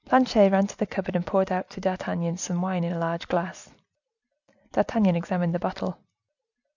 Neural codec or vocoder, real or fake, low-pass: none; real; 7.2 kHz